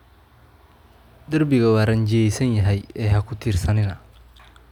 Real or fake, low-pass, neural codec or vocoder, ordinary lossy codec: real; 19.8 kHz; none; none